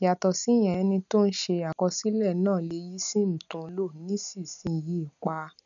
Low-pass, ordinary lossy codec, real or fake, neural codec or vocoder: 7.2 kHz; none; real; none